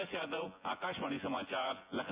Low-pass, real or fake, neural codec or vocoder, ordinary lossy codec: 3.6 kHz; fake; vocoder, 24 kHz, 100 mel bands, Vocos; Opus, 64 kbps